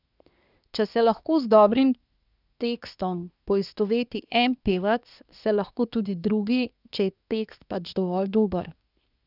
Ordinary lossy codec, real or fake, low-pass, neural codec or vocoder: none; fake; 5.4 kHz; codec, 24 kHz, 1 kbps, SNAC